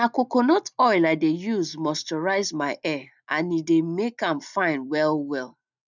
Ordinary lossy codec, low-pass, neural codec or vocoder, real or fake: none; 7.2 kHz; none; real